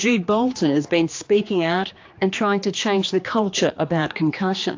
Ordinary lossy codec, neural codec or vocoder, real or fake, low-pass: AAC, 48 kbps; codec, 16 kHz, 2 kbps, X-Codec, HuBERT features, trained on general audio; fake; 7.2 kHz